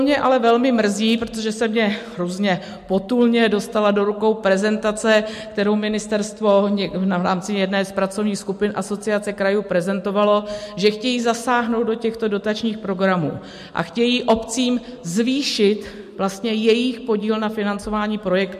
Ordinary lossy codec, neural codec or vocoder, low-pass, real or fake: MP3, 64 kbps; none; 14.4 kHz; real